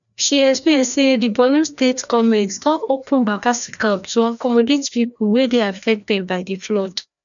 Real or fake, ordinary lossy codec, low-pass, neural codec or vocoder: fake; none; 7.2 kHz; codec, 16 kHz, 1 kbps, FreqCodec, larger model